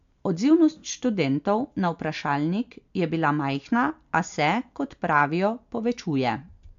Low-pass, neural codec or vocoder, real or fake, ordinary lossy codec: 7.2 kHz; none; real; AAC, 64 kbps